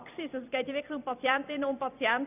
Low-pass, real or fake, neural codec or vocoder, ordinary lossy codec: 3.6 kHz; fake; vocoder, 44.1 kHz, 128 mel bands every 256 samples, BigVGAN v2; none